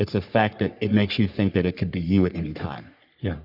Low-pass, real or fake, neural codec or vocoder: 5.4 kHz; fake; codec, 44.1 kHz, 3.4 kbps, Pupu-Codec